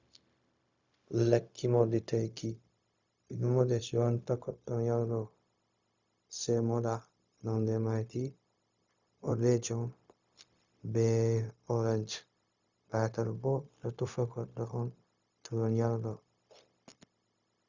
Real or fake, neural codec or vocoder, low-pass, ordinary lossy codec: fake; codec, 16 kHz, 0.4 kbps, LongCat-Audio-Codec; 7.2 kHz; Opus, 64 kbps